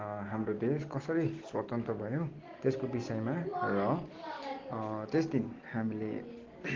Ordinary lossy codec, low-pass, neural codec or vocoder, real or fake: Opus, 16 kbps; 7.2 kHz; none; real